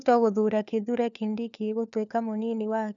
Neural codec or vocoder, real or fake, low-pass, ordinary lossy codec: codec, 16 kHz, 4 kbps, FunCodec, trained on LibriTTS, 50 frames a second; fake; 7.2 kHz; none